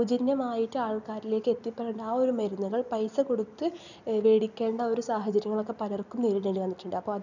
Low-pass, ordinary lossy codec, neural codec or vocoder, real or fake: 7.2 kHz; none; none; real